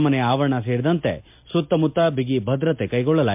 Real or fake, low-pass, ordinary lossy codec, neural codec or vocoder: real; 3.6 kHz; MP3, 32 kbps; none